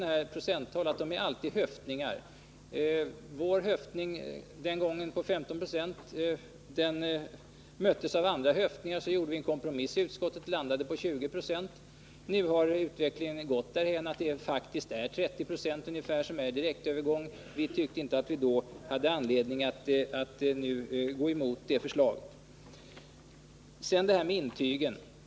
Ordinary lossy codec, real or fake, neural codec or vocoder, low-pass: none; real; none; none